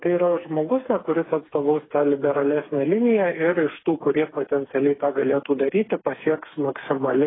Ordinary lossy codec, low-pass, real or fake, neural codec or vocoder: AAC, 16 kbps; 7.2 kHz; fake; codec, 16 kHz, 4 kbps, FreqCodec, smaller model